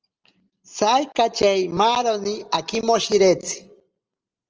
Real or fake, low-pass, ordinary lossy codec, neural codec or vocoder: real; 7.2 kHz; Opus, 24 kbps; none